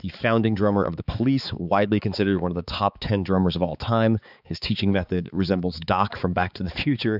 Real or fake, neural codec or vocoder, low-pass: fake; codec, 16 kHz, 4 kbps, X-Codec, HuBERT features, trained on balanced general audio; 5.4 kHz